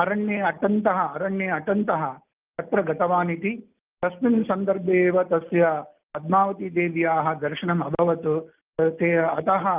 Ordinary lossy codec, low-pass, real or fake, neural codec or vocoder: Opus, 32 kbps; 3.6 kHz; real; none